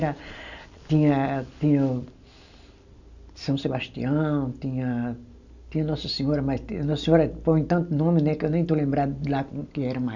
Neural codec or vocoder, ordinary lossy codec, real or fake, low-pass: none; none; real; 7.2 kHz